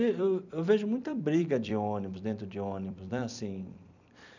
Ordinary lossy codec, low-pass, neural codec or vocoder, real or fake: none; 7.2 kHz; vocoder, 44.1 kHz, 128 mel bands every 256 samples, BigVGAN v2; fake